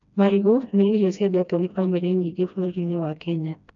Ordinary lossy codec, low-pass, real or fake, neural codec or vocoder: AAC, 64 kbps; 7.2 kHz; fake; codec, 16 kHz, 1 kbps, FreqCodec, smaller model